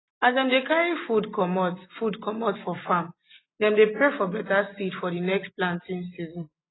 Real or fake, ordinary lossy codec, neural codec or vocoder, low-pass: real; AAC, 16 kbps; none; 7.2 kHz